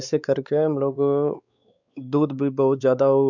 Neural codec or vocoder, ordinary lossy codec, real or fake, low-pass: codec, 16 kHz, 4 kbps, X-Codec, WavLM features, trained on Multilingual LibriSpeech; none; fake; 7.2 kHz